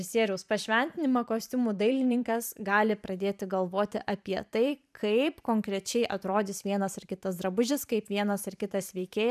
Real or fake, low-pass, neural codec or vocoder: fake; 14.4 kHz; vocoder, 44.1 kHz, 128 mel bands every 512 samples, BigVGAN v2